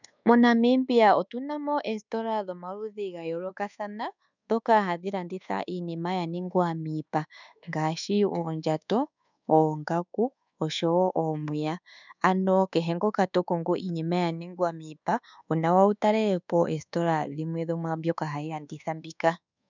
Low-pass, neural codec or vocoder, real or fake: 7.2 kHz; codec, 24 kHz, 1.2 kbps, DualCodec; fake